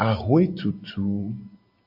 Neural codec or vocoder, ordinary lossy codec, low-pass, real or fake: none; AAC, 48 kbps; 5.4 kHz; real